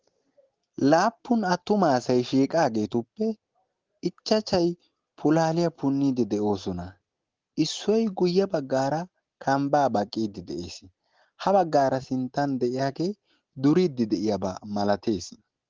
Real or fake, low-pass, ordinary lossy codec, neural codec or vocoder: real; 7.2 kHz; Opus, 16 kbps; none